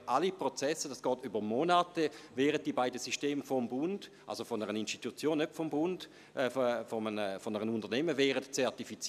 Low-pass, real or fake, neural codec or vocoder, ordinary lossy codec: 14.4 kHz; real; none; none